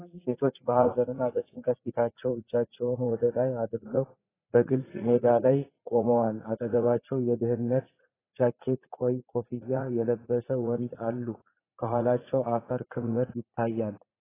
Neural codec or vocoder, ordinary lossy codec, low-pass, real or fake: vocoder, 44.1 kHz, 80 mel bands, Vocos; AAC, 16 kbps; 3.6 kHz; fake